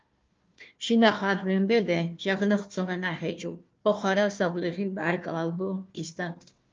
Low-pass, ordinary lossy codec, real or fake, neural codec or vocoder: 7.2 kHz; Opus, 24 kbps; fake; codec, 16 kHz, 1 kbps, FunCodec, trained on Chinese and English, 50 frames a second